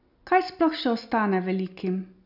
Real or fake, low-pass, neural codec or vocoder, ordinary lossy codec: real; 5.4 kHz; none; none